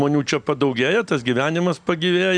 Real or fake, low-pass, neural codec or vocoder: real; 9.9 kHz; none